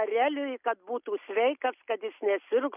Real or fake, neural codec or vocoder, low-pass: real; none; 3.6 kHz